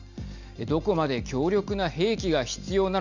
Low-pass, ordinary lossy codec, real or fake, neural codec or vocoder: 7.2 kHz; none; real; none